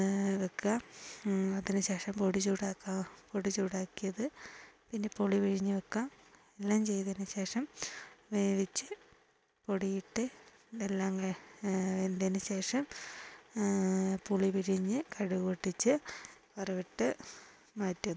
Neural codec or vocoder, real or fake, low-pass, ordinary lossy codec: none; real; none; none